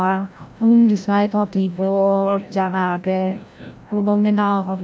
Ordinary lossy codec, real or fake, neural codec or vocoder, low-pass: none; fake; codec, 16 kHz, 0.5 kbps, FreqCodec, larger model; none